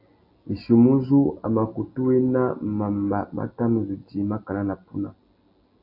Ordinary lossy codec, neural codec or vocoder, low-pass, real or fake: AAC, 32 kbps; none; 5.4 kHz; real